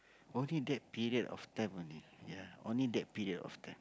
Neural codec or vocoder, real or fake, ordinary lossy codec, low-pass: none; real; none; none